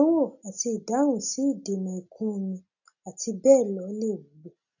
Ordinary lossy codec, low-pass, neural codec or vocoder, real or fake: none; 7.2 kHz; none; real